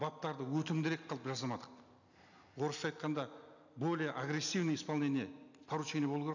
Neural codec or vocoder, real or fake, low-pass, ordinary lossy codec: none; real; 7.2 kHz; none